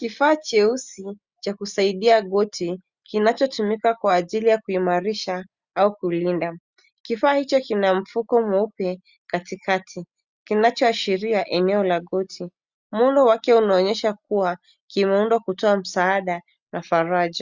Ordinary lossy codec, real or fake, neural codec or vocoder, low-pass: Opus, 64 kbps; real; none; 7.2 kHz